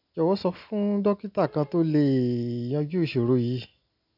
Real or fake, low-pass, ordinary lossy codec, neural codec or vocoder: real; 5.4 kHz; none; none